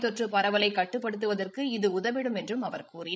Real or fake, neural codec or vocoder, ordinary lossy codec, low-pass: fake; codec, 16 kHz, 16 kbps, FreqCodec, larger model; none; none